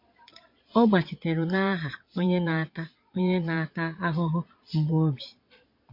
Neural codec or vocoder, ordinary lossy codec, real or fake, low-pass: vocoder, 22.05 kHz, 80 mel bands, Vocos; MP3, 32 kbps; fake; 5.4 kHz